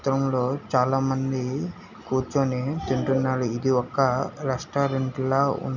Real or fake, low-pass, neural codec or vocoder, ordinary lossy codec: real; 7.2 kHz; none; none